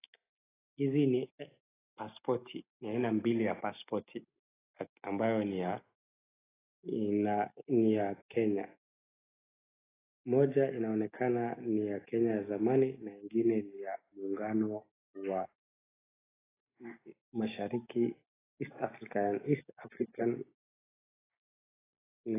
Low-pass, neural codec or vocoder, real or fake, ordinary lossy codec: 3.6 kHz; none; real; AAC, 16 kbps